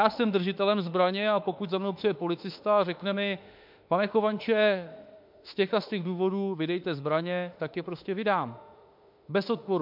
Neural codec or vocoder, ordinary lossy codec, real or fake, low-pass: autoencoder, 48 kHz, 32 numbers a frame, DAC-VAE, trained on Japanese speech; MP3, 48 kbps; fake; 5.4 kHz